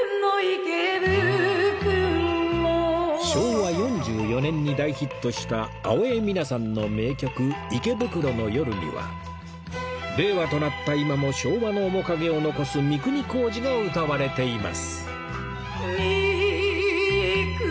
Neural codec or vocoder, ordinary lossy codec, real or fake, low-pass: none; none; real; none